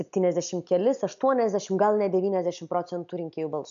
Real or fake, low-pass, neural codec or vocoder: real; 7.2 kHz; none